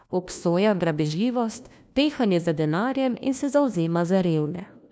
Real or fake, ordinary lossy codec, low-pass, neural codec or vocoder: fake; none; none; codec, 16 kHz, 1 kbps, FunCodec, trained on LibriTTS, 50 frames a second